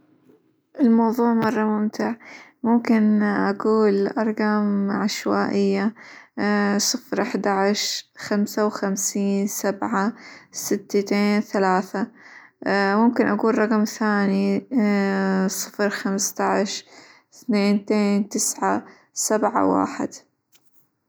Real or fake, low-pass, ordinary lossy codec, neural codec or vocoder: real; none; none; none